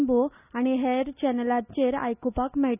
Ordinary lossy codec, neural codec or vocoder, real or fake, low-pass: none; none; real; 3.6 kHz